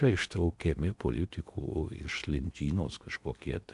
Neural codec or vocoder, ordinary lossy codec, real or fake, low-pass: codec, 16 kHz in and 24 kHz out, 0.8 kbps, FocalCodec, streaming, 65536 codes; MP3, 96 kbps; fake; 10.8 kHz